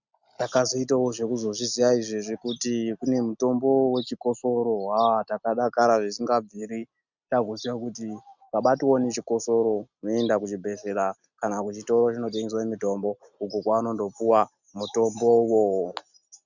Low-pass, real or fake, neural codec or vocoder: 7.2 kHz; real; none